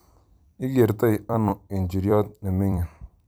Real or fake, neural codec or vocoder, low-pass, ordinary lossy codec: fake; vocoder, 44.1 kHz, 128 mel bands every 256 samples, BigVGAN v2; none; none